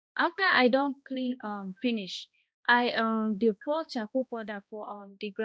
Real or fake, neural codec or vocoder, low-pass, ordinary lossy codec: fake; codec, 16 kHz, 1 kbps, X-Codec, HuBERT features, trained on balanced general audio; none; none